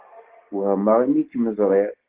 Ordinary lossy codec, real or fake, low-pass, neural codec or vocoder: Opus, 16 kbps; real; 3.6 kHz; none